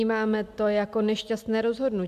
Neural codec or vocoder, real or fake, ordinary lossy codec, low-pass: none; real; AAC, 96 kbps; 14.4 kHz